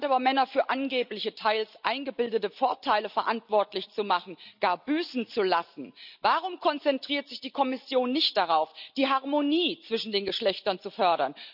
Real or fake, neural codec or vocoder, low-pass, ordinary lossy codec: real; none; 5.4 kHz; none